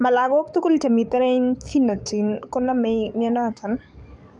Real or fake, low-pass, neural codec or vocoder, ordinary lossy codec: fake; 10.8 kHz; codec, 44.1 kHz, 7.8 kbps, DAC; none